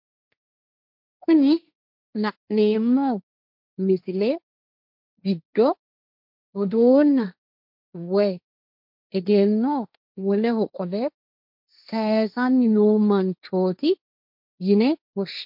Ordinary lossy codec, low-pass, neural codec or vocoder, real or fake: MP3, 48 kbps; 5.4 kHz; codec, 16 kHz, 1.1 kbps, Voila-Tokenizer; fake